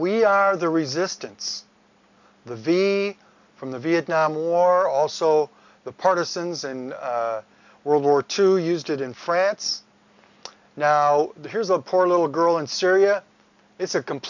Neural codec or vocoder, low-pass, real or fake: none; 7.2 kHz; real